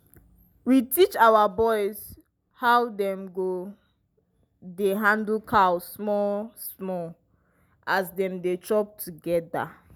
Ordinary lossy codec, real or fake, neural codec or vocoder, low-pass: none; real; none; none